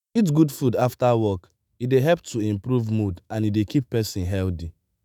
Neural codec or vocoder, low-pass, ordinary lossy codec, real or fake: autoencoder, 48 kHz, 128 numbers a frame, DAC-VAE, trained on Japanese speech; none; none; fake